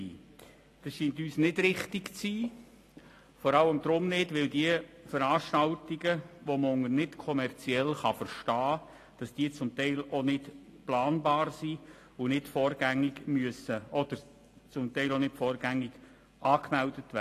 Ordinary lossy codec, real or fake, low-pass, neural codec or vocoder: AAC, 48 kbps; real; 14.4 kHz; none